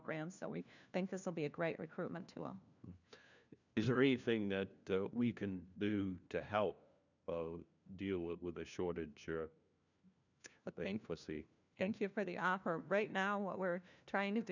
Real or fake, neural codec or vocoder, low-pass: fake; codec, 16 kHz, 1 kbps, FunCodec, trained on LibriTTS, 50 frames a second; 7.2 kHz